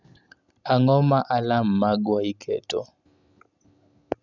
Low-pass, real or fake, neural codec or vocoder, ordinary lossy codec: 7.2 kHz; real; none; none